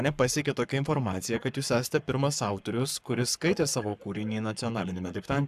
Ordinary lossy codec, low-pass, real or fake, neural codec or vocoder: AAC, 96 kbps; 14.4 kHz; fake; codec, 44.1 kHz, 7.8 kbps, Pupu-Codec